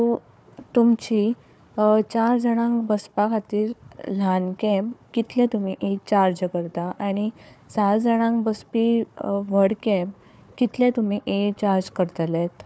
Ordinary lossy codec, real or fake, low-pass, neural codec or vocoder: none; fake; none; codec, 16 kHz, 4 kbps, FunCodec, trained on Chinese and English, 50 frames a second